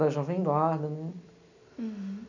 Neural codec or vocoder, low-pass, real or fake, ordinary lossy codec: none; 7.2 kHz; real; none